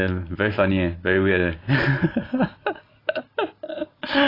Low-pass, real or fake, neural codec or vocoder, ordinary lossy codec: 5.4 kHz; real; none; AAC, 24 kbps